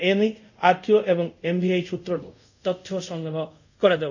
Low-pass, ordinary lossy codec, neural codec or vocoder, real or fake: 7.2 kHz; none; codec, 24 kHz, 0.5 kbps, DualCodec; fake